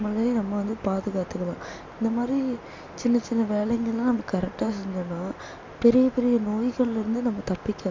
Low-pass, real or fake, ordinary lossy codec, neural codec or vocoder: 7.2 kHz; real; MP3, 48 kbps; none